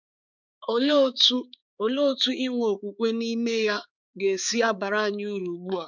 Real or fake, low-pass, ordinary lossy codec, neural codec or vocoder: fake; 7.2 kHz; none; codec, 16 kHz, 4 kbps, X-Codec, HuBERT features, trained on balanced general audio